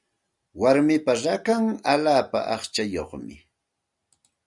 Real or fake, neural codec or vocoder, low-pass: real; none; 10.8 kHz